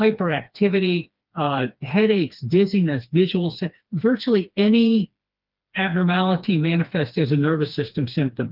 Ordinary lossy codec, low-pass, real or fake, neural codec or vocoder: Opus, 32 kbps; 5.4 kHz; fake; codec, 16 kHz, 2 kbps, FreqCodec, smaller model